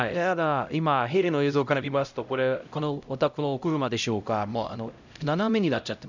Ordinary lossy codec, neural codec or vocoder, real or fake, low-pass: none; codec, 16 kHz, 0.5 kbps, X-Codec, HuBERT features, trained on LibriSpeech; fake; 7.2 kHz